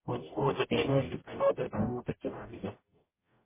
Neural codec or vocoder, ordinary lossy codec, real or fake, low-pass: codec, 44.1 kHz, 0.9 kbps, DAC; MP3, 16 kbps; fake; 3.6 kHz